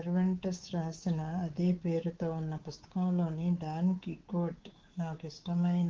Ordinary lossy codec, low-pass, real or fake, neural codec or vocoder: Opus, 16 kbps; 7.2 kHz; fake; codec, 24 kHz, 3.1 kbps, DualCodec